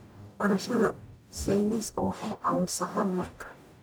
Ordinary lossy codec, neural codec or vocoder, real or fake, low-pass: none; codec, 44.1 kHz, 0.9 kbps, DAC; fake; none